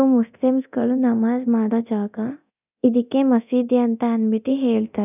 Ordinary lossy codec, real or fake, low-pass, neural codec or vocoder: none; fake; 3.6 kHz; codec, 24 kHz, 0.9 kbps, DualCodec